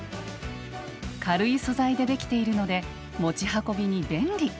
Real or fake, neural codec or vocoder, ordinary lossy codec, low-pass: real; none; none; none